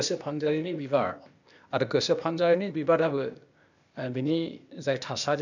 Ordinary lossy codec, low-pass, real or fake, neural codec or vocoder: none; 7.2 kHz; fake; codec, 16 kHz, 0.8 kbps, ZipCodec